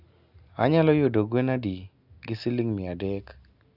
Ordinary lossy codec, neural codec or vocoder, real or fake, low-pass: none; none; real; 5.4 kHz